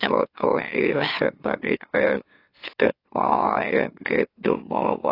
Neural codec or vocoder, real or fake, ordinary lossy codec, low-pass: autoencoder, 44.1 kHz, a latent of 192 numbers a frame, MeloTTS; fake; AAC, 24 kbps; 5.4 kHz